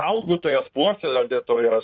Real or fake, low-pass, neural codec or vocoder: fake; 7.2 kHz; codec, 16 kHz in and 24 kHz out, 2.2 kbps, FireRedTTS-2 codec